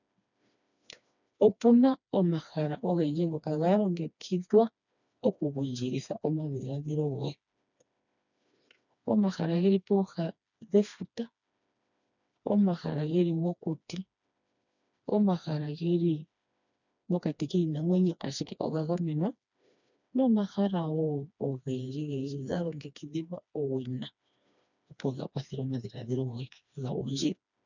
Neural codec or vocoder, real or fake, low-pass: codec, 16 kHz, 2 kbps, FreqCodec, smaller model; fake; 7.2 kHz